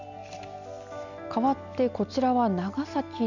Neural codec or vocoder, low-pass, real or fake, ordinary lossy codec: none; 7.2 kHz; real; none